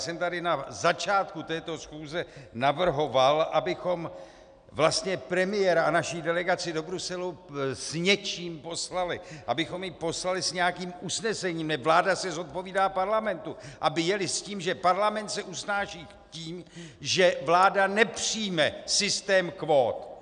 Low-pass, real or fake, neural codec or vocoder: 9.9 kHz; real; none